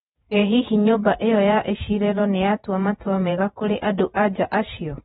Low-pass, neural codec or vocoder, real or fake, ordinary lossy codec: 19.8 kHz; vocoder, 48 kHz, 128 mel bands, Vocos; fake; AAC, 16 kbps